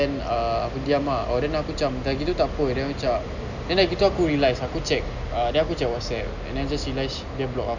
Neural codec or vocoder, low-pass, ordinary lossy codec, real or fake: none; 7.2 kHz; none; real